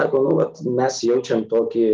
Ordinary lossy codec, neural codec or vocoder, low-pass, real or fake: Opus, 32 kbps; none; 7.2 kHz; real